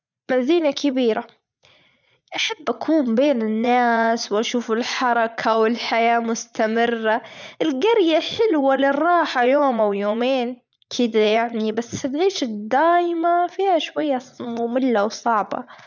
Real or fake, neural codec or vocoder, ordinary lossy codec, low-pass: fake; vocoder, 44.1 kHz, 80 mel bands, Vocos; none; 7.2 kHz